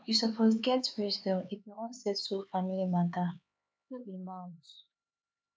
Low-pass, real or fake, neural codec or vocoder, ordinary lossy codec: none; fake; codec, 16 kHz, 4 kbps, X-Codec, HuBERT features, trained on LibriSpeech; none